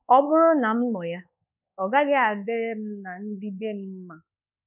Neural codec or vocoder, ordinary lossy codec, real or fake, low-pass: codec, 24 kHz, 1.2 kbps, DualCodec; none; fake; 3.6 kHz